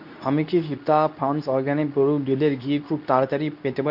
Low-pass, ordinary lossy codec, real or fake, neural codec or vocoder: 5.4 kHz; none; fake; codec, 24 kHz, 0.9 kbps, WavTokenizer, medium speech release version 2